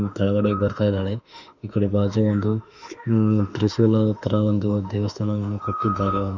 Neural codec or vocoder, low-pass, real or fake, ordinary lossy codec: autoencoder, 48 kHz, 32 numbers a frame, DAC-VAE, trained on Japanese speech; 7.2 kHz; fake; none